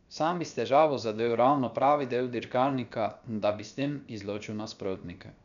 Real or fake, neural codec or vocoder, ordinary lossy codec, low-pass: fake; codec, 16 kHz, 0.7 kbps, FocalCodec; none; 7.2 kHz